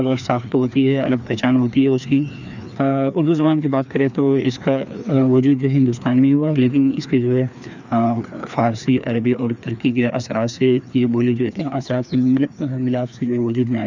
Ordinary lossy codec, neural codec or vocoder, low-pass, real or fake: none; codec, 16 kHz, 2 kbps, FreqCodec, larger model; 7.2 kHz; fake